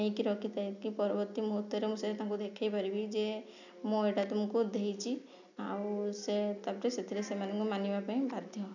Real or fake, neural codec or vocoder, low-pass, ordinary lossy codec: real; none; 7.2 kHz; none